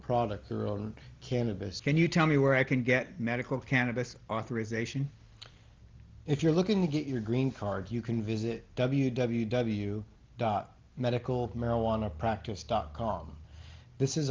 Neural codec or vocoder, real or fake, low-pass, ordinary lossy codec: none; real; 7.2 kHz; Opus, 32 kbps